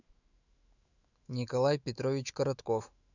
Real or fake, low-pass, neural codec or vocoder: fake; 7.2 kHz; autoencoder, 48 kHz, 128 numbers a frame, DAC-VAE, trained on Japanese speech